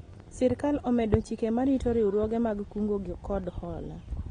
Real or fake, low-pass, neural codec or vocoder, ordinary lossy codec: real; 9.9 kHz; none; AAC, 32 kbps